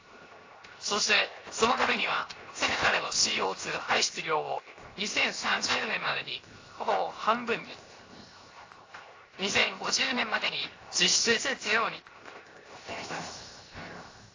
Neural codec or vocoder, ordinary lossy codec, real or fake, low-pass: codec, 16 kHz, 0.7 kbps, FocalCodec; AAC, 32 kbps; fake; 7.2 kHz